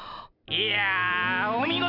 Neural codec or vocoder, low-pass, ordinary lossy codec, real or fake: none; 5.4 kHz; none; real